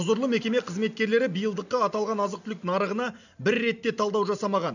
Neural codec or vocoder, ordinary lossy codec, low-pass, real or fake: none; none; 7.2 kHz; real